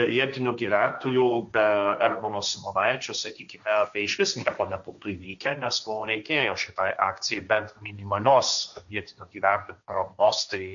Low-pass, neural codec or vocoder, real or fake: 7.2 kHz; codec, 16 kHz, 1.1 kbps, Voila-Tokenizer; fake